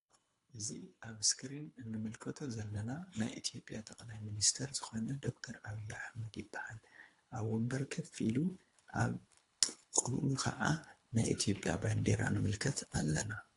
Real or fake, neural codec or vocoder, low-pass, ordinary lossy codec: fake; codec, 24 kHz, 3 kbps, HILCodec; 10.8 kHz; AAC, 48 kbps